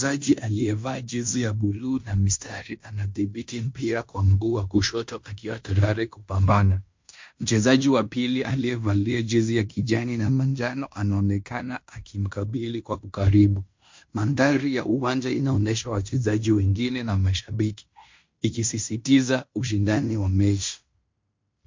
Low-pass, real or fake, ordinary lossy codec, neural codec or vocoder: 7.2 kHz; fake; MP3, 48 kbps; codec, 16 kHz in and 24 kHz out, 0.9 kbps, LongCat-Audio-Codec, fine tuned four codebook decoder